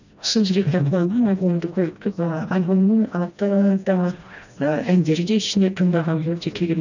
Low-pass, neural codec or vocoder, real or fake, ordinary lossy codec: 7.2 kHz; codec, 16 kHz, 1 kbps, FreqCodec, smaller model; fake; none